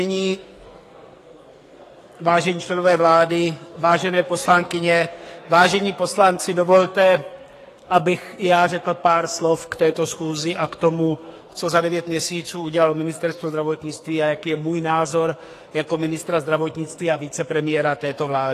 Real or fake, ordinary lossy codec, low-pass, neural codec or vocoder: fake; AAC, 48 kbps; 14.4 kHz; codec, 44.1 kHz, 2.6 kbps, SNAC